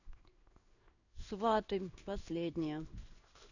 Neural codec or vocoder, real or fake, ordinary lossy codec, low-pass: codec, 16 kHz in and 24 kHz out, 1 kbps, XY-Tokenizer; fake; none; 7.2 kHz